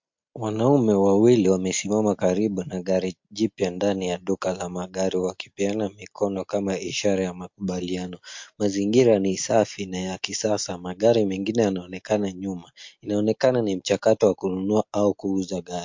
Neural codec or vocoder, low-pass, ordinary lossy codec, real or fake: none; 7.2 kHz; MP3, 48 kbps; real